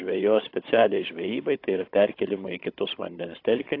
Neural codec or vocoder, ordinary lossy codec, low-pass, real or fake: codec, 16 kHz, 16 kbps, FunCodec, trained on Chinese and English, 50 frames a second; AAC, 32 kbps; 5.4 kHz; fake